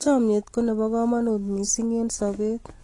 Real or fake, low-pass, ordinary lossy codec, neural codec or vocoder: real; 10.8 kHz; AAC, 32 kbps; none